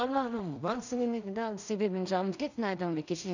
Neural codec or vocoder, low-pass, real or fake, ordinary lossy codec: codec, 16 kHz in and 24 kHz out, 0.4 kbps, LongCat-Audio-Codec, two codebook decoder; 7.2 kHz; fake; none